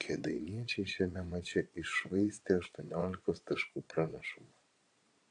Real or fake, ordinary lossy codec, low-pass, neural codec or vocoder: fake; AAC, 48 kbps; 9.9 kHz; vocoder, 22.05 kHz, 80 mel bands, Vocos